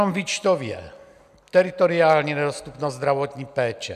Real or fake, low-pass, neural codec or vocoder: real; 14.4 kHz; none